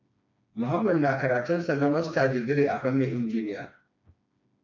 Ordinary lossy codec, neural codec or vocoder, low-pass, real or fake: MP3, 64 kbps; codec, 16 kHz, 2 kbps, FreqCodec, smaller model; 7.2 kHz; fake